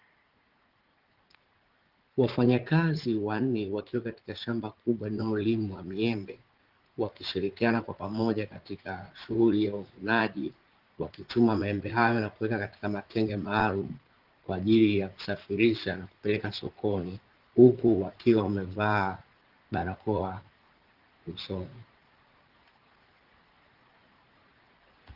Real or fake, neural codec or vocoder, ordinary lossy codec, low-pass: fake; vocoder, 44.1 kHz, 80 mel bands, Vocos; Opus, 16 kbps; 5.4 kHz